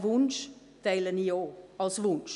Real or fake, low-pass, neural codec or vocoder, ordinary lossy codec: real; 10.8 kHz; none; none